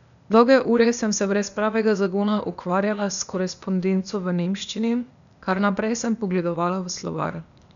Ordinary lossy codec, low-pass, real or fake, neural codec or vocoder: none; 7.2 kHz; fake; codec, 16 kHz, 0.8 kbps, ZipCodec